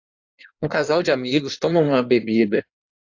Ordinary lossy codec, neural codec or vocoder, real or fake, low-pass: AAC, 48 kbps; codec, 16 kHz in and 24 kHz out, 1.1 kbps, FireRedTTS-2 codec; fake; 7.2 kHz